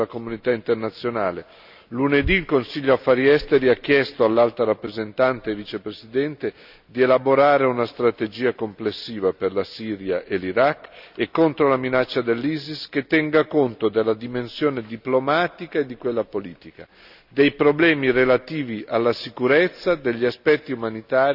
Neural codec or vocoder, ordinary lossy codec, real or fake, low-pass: none; none; real; 5.4 kHz